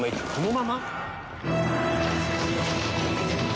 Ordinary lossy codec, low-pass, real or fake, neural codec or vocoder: none; none; real; none